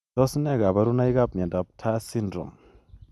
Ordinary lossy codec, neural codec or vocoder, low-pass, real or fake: none; none; none; real